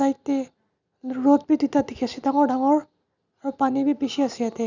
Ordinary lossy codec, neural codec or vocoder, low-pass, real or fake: none; none; 7.2 kHz; real